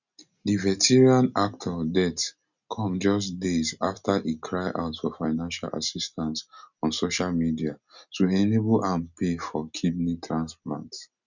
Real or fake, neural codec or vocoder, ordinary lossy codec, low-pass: real; none; none; 7.2 kHz